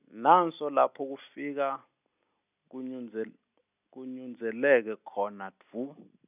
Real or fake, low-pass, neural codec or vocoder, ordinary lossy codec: real; 3.6 kHz; none; none